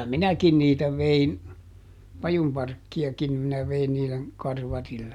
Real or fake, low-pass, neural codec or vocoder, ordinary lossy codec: real; 19.8 kHz; none; none